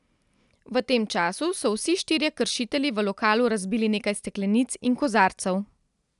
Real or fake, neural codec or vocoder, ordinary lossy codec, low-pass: real; none; none; 10.8 kHz